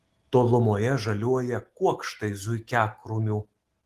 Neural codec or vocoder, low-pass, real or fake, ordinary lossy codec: autoencoder, 48 kHz, 128 numbers a frame, DAC-VAE, trained on Japanese speech; 14.4 kHz; fake; Opus, 16 kbps